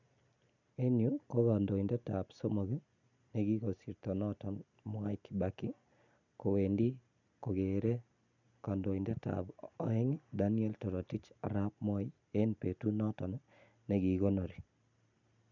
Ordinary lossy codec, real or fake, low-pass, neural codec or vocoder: none; real; 7.2 kHz; none